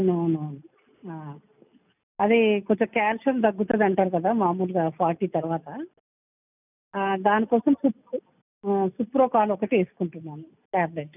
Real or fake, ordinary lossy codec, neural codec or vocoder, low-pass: real; none; none; 3.6 kHz